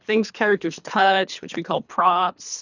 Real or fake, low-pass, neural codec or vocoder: fake; 7.2 kHz; codec, 24 kHz, 3 kbps, HILCodec